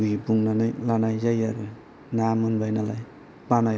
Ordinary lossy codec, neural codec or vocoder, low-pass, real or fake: none; none; none; real